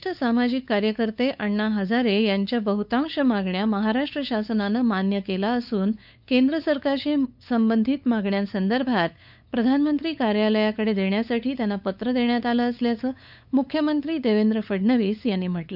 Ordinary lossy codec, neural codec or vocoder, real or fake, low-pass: none; codec, 16 kHz, 8 kbps, FunCodec, trained on LibriTTS, 25 frames a second; fake; 5.4 kHz